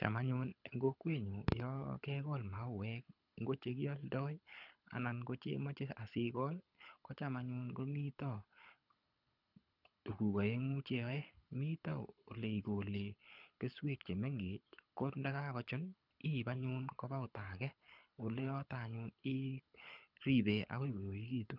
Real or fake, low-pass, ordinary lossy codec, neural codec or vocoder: fake; 5.4 kHz; none; codec, 24 kHz, 6 kbps, HILCodec